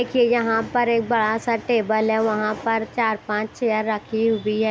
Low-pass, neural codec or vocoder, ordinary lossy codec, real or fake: none; none; none; real